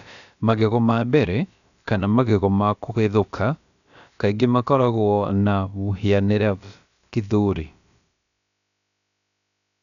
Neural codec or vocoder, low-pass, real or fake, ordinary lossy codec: codec, 16 kHz, about 1 kbps, DyCAST, with the encoder's durations; 7.2 kHz; fake; none